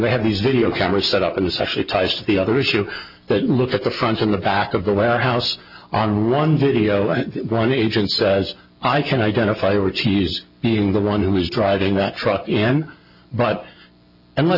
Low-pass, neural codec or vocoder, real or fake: 5.4 kHz; none; real